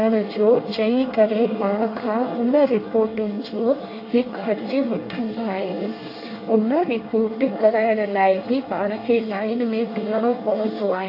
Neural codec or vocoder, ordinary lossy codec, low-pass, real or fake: codec, 24 kHz, 1 kbps, SNAC; AAC, 32 kbps; 5.4 kHz; fake